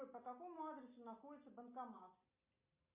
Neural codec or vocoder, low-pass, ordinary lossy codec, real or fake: none; 3.6 kHz; MP3, 32 kbps; real